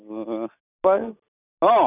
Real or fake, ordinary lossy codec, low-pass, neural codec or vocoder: real; none; 3.6 kHz; none